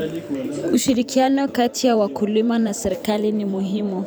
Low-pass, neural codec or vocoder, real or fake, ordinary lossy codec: none; vocoder, 44.1 kHz, 128 mel bands every 512 samples, BigVGAN v2; fake; none